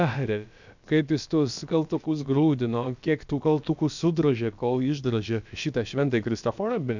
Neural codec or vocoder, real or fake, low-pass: codec, 16 kHz, about 1 kbps, DyCAST, with the encoder's durations; fake; 7.2 kHz